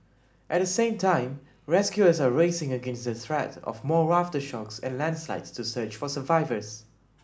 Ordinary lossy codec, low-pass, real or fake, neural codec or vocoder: none; none; real; none